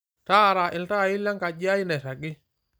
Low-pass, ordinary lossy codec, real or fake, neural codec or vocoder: none; none; real; none